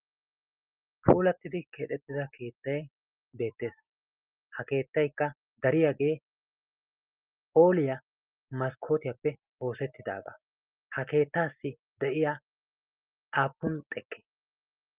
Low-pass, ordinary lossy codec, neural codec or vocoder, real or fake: 3.6 kHz; Opus, 24 kbps; none; real